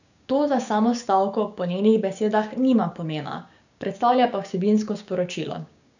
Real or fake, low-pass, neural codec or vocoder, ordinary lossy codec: fake; 7.2 kHz; codec, 16 kHz, 6 kbps, DAC; none